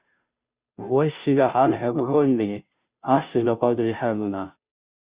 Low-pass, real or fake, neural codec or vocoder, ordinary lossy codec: 3.6 kHz; fake; codec, 16 kHz, 0.5 kbps, FunCodec, trained on Chinese and English, 25 frames a second; Opus, 64 kbps